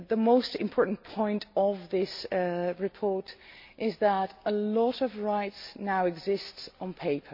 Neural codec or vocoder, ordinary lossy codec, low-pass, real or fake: none; MP3, 32 kbps; 5.4 kHz; real